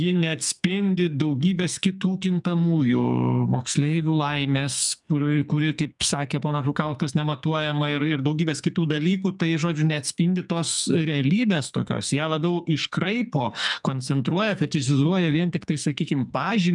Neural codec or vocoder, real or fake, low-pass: codec, 44.1 kHz, 2.6 kbps, SNAC; fake; 10.8 kHz